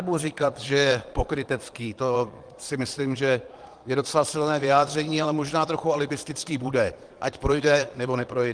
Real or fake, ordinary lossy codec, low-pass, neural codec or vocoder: fake; Opus, 24 kbps; 9.9 kHz; vocoder, 22.05 kHz, 80 mel bands, Vocos